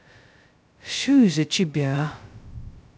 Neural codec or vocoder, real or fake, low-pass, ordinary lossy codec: codec, 16 kHz, 0.2 kbps, FocalCodec; fake; none; none